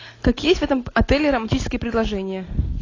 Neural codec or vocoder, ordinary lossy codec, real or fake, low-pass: none; AAC, 32 kbps; real; 7.2 kHz